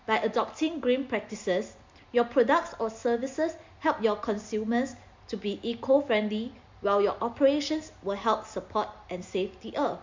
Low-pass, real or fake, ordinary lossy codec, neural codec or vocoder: 7.2 kHz; real; MP3, 48 kbps; none